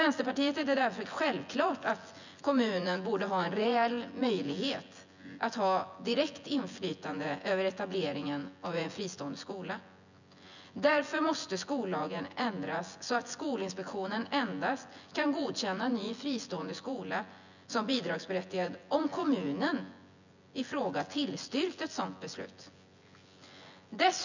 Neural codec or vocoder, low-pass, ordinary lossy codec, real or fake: vocoder, 24 kHz, 100 mel bands, Vocos; 7.2 kHz; none; fake